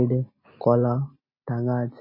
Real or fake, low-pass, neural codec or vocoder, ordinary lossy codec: real; 5.4 kHz; none; MP3, 24 kbps